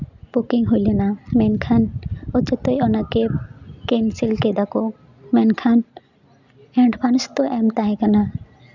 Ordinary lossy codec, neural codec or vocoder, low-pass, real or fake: none; none; 7.2 kHz; real